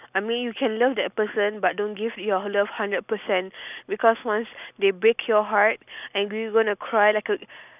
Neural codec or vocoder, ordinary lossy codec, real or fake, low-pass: none; none; real; 3.6 kHz